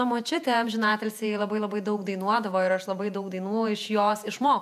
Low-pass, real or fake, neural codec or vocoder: 14.4 kHz; fake; vocoder, 48 kHz, 128 mel bands, Vocos